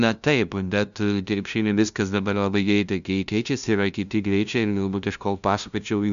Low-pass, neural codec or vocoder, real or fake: 7.2 kHz; codec, 16 kHz, 0.5 kbps, FunCodec, trained on LibriTTS, 25 frames a second; fake